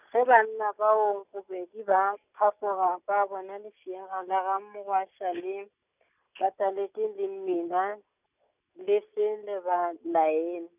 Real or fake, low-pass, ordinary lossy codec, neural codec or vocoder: real; 3.6 kHz; none; none